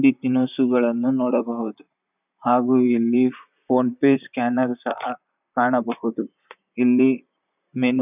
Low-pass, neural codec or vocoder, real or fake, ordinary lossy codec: 3.6 kHz; vocoder, 44.1 kHz, 128 mel bands, Pupu-Vocoder; fake; none